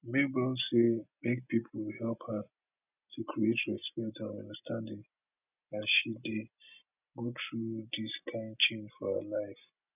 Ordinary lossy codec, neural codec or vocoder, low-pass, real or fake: none; none; 3.6 kHz; real